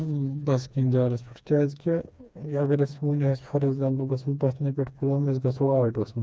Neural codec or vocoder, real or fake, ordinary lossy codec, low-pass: codec, 16 kHz, 2 kbps, FreqCodec, smaller model; fake; none; none